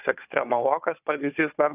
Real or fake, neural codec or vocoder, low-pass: fake; codec, 16 kHz, 4 kbps, FunCodec, trained on Chinese and English, 50 frames a second; 3.6 kHz